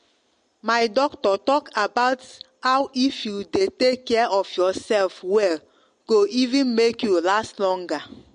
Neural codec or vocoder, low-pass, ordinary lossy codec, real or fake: none; 9.9 kHz; MP3, 48 kbps; real